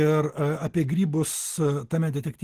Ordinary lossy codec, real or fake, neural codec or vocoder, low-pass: Opus, 16 kbps; real; none; 14.4 kHz